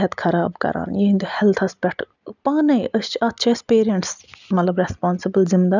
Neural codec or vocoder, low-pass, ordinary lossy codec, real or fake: none; 7.2 kHz; none; real